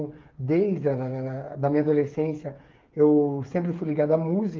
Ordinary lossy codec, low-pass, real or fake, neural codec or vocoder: Opus, 32 kbps; 7.2 kHz; fake; codec, 16 kHz, 8 kbps, FreqCodec, smaller model